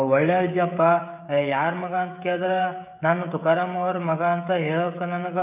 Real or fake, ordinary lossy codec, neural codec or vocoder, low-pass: fake; none; codec, 16 kHz, 16 kbps, FreqCodec, smaller model; 3.6 kHz